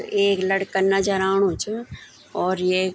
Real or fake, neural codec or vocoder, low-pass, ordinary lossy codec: real; none; none; none